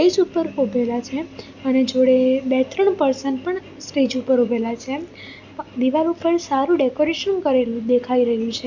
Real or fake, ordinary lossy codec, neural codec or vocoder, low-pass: real; none; none; 7.2 kHz